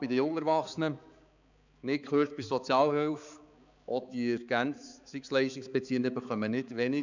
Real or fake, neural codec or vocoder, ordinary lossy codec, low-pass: fake; codec, 16 kHz, 4 kbps, X-Codec, HuBERT features, trained on balanced general audio; none; 7.2 kHz